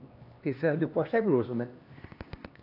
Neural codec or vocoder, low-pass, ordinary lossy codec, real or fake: codec, 16 kHz, 2 kbps, X-Codec, HuBERT features, trained on LibriSpeech; 5.4 kHz; AAC, 32 kbps; fake